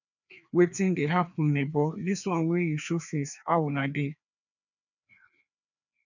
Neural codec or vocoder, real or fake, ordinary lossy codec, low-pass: codec, 16 kHz, 2 kbps, FreqCodec, larger model; fake; none; 7.2 kHz